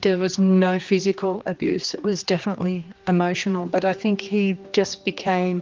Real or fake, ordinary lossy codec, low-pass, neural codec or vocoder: fake; Opus, 24 kbps; 7.2 kHz; codec, 16 kHz, 2 kbps, X-Codec, HuBERT features, trained on general audio